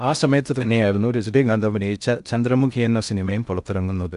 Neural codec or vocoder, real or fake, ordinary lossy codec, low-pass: codec, 16 kHz in and 24 kHz out, 0.6 kbps, FocalCodec, streaming, 4096 codes; fake; none; 10.8 kHz